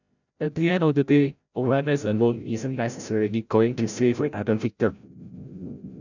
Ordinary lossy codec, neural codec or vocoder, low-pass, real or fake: none; codec, 16 kHz, 0.5 kbps, FreqCodec, larger model; 7.2 kHz; fake